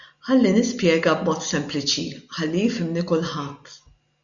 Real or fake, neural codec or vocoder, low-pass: real; none; 7.2 kHz